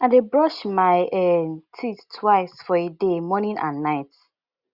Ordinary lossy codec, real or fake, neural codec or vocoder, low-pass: Opus, 64 kbps; real; none; 5.4 kHz